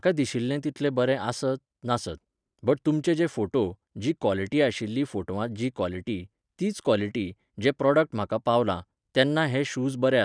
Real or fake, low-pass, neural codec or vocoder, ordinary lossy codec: real; 9.9 kHz; none; none